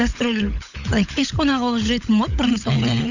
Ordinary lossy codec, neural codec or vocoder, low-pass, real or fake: none; codec, 16 kHz, 16 kbps, FunCodec, trained on LibriTTS, 50 frames a second; 7.2 kHz; fake